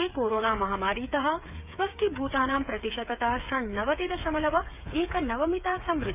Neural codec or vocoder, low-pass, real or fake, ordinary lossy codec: codec, 16 kHz, 8 kbps, FreqCodec, smaller model; 3.6 kHz; fake; none